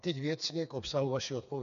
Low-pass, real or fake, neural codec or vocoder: 7.2 kHz; fake; codec, 16 kHz, 4 kbps, FreqCodec, smaller model